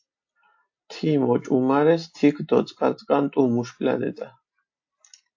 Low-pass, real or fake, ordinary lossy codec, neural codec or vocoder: 7.2 kHz; real; AAC, 48 kbps; none